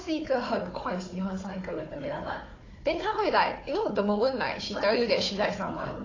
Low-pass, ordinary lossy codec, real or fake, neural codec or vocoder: 7.2 kHz; AAC, 32 kbps; fake; codec, 16 kHz, 4 kbps, FunCodec, trained on Chinese and English, 50 frames a second